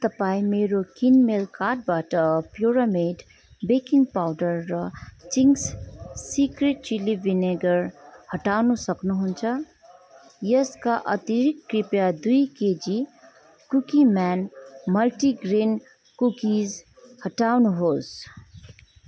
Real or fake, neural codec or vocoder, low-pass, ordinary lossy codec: real; none; none; none